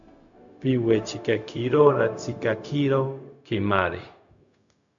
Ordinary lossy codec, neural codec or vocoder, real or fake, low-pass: MP3, 96 kbps; codec, 16 kHz, 0.4 kbps, LongCat-Audio-Codec; fake; 7.2 kHz